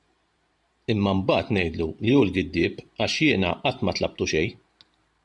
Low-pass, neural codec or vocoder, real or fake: 10.8 kHz; vocoder, 44.1 kHz, 128 mel bands every 512 samples, BigVGAN v2; fake